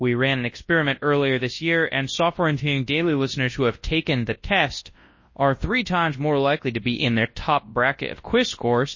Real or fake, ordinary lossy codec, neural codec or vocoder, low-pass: fake; MP3, 32 kbps; codec, 24 kHz, 0.9 kbps, WavTokenizer, large speech release; 7.2 kHz